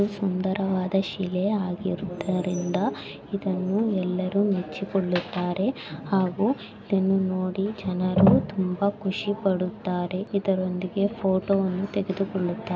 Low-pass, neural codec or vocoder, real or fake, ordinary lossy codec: none; none; real; none